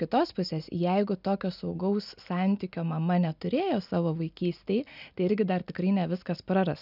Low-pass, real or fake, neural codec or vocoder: 5.4 kHz; real; none